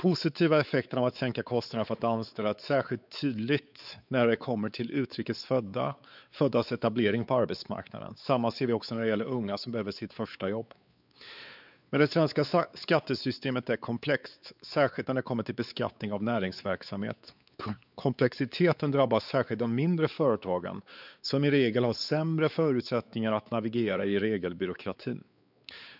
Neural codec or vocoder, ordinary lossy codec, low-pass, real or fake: codec, 16 kHz, 4 kbps, X-Codec, WavLM features, trained on Multilingual LibriSpeech; none; 5.4 kHz; fake